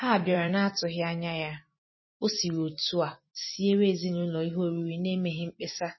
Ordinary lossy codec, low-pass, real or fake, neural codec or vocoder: MP3, 24 kbps; 7.2 kHz; fake; vocoder, 44.1 kHz, 128 mel bands every 256 samples, BigVGAN v2